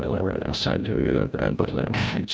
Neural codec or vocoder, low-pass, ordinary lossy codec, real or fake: codec, 16 kHz, 0.5 kbps, FreqCodec, larger model; none; none; fake